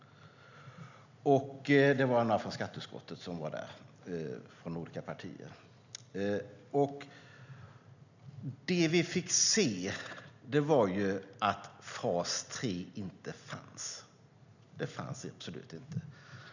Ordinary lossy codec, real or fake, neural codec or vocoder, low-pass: none; real; none; 7.2 kHz